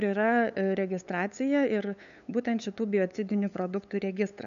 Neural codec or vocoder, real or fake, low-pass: codec, 16 kHz, 8 kbps, FunCodec, trained on LibriTTS, 25 frames a second; fake; 7.2 kHz